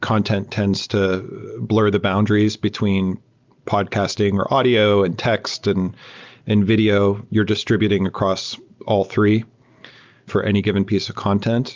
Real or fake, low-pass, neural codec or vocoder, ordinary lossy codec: real; 7.2 kHz; none; Opus, 24 kbps